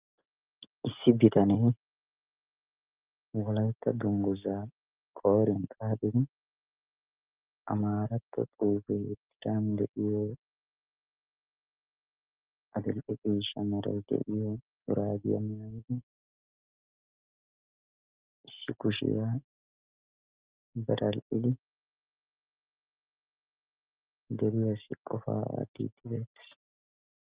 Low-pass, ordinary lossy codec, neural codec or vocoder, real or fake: 3.6 kHz; Opus, 24 kbps; none; real